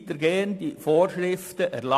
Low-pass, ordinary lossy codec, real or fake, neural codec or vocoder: 14.4 kHz; AAC, 64 kbps; real; none